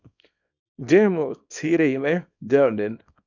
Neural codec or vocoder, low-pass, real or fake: codec, 24 kHz, 0.9 kbps, WavTokenizer, small release; 7.2 kHz; fake